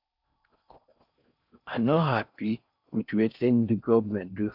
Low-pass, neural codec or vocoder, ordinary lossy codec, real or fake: 5.4 kHz; codec, 16 kHz in and 24 kHz out, 0.6 kbps, FocalCodec, streaming, 4096 codes; none; fake